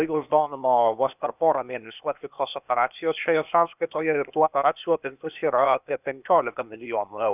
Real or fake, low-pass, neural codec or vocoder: fake; 3.6 kHz; codec, 16 kHz, 0.8 kbps, ZipCodec